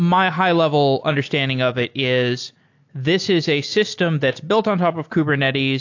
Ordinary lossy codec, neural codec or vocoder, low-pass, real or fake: AAC, 48 kbps; none; 7.2 kHz; real